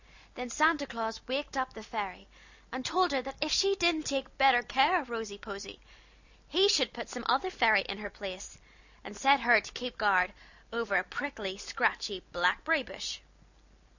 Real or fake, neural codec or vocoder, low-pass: real; none; 7.2 kHz